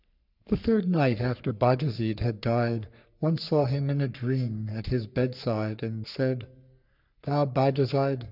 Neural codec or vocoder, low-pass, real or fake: codec, 44.1 kHz, 3.4 kbps, Pupu-Codec; 5.4 kHz; fake